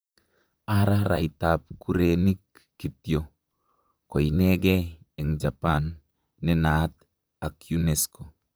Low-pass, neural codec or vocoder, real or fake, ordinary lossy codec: none; vocoder, 44.1 kHz, 128 mel bands, Pupu-Vocoder; fake; none